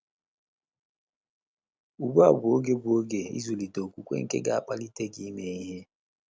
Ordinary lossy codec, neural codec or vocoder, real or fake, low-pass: none; none; real; none